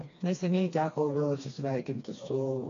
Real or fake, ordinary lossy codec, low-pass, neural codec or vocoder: fake; AAC, 48 kbps; 7.2 kHz; codec, 16 kHz, 2 kbps, FreqCodec, smaller model